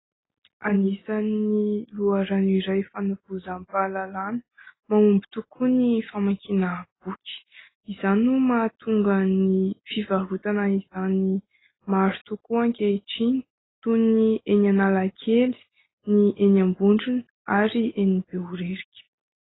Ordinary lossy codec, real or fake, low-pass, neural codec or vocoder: AAC, 16 kbps; real; 7.2 kHz; none